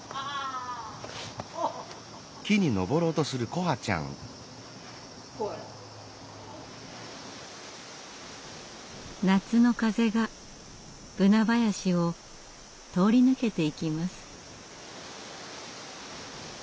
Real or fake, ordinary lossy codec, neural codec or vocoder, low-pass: real; none; none; none